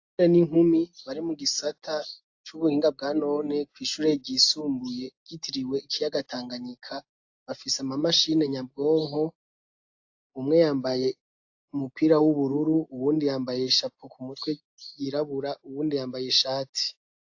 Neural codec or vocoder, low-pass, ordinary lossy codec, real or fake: none; 7.2 kHz; AAC, 48 kbps; real